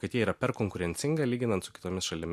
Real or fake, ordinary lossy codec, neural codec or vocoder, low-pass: real; MP3, 64 kbps; none; 14.4 kHz